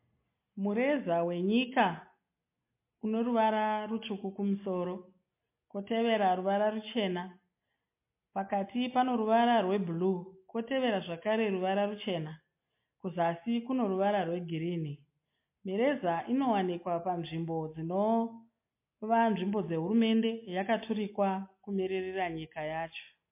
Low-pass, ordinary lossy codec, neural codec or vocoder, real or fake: 3.6 kHz; MP3, 24 kbps; none; real